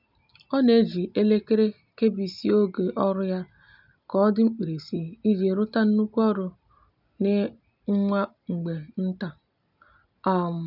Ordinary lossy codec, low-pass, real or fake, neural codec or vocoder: none; 5.4 kHz; real; none